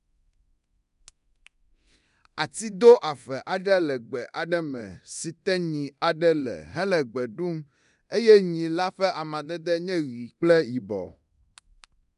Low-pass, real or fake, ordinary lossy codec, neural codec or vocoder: 10.8 kHz; fake; none; codec, 24 kHz, 0.9 kbps, DualCodec